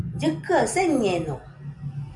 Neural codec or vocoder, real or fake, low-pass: none; real; 10.8 kHz